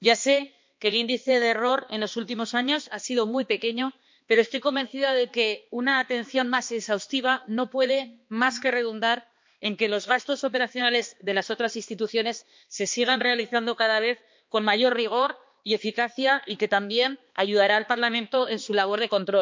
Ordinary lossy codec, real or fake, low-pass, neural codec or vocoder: MP3, 48 kbps; fake; 7.2 kHz; codec, 16 kHz, 2 kbps, X-Codec, HuBERT features, trained on balanced general audio